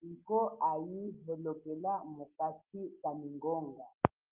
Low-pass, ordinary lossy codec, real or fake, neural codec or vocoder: 3.6 kHz; Opus, 32 kbps; real; none